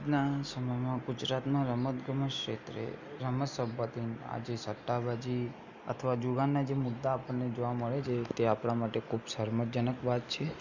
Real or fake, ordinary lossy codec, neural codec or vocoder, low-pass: real; none; none; 7.2 kHz